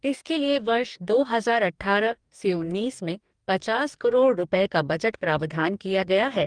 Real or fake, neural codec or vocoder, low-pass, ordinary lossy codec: fake; codec, 44.1 kHz, 2.6 kbps, DAC; 9.9 kHz; Opus, 32 kbps